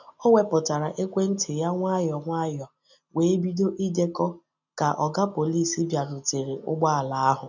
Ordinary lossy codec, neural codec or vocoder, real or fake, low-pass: none; none; real; 7.2 kHz